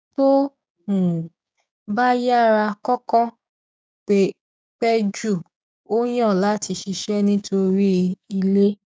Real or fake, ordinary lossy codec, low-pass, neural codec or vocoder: fake; none; none; codec, 16 kHz, 6 kbps, DAC